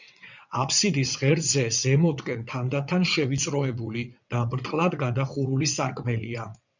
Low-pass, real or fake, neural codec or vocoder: 7.2 kHz; fake; vocoder, 22.05 kHz, 80 mel bands, WaveNeXt